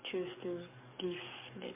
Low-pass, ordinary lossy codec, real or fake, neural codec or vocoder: 3.6 kHz; MP3, 24 kbps; fake; codec, 44.1 kHz, 7.8 kbps, DAC